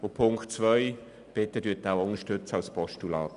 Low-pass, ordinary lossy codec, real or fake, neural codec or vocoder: 10.8 kHz; none; real; none